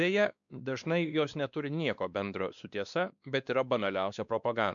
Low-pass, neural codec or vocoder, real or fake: 7.2 kHz; codec, 16 kHz, 2 kbps, X-Codec, WavLM features, trained on Multilingual LibriSpeech; fake